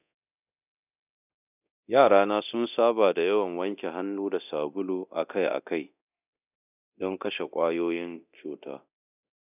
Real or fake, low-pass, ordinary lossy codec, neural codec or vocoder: fake; 3.6 kHz; none; codec, 24 kHz, 0.9 kbps, DualCodec